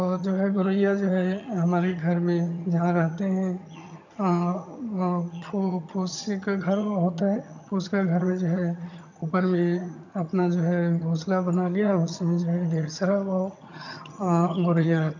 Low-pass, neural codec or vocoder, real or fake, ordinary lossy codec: 7.2 kHz; vocoder, 22.05 kHz, 80 mel bands, HiFi-GAN; fake; none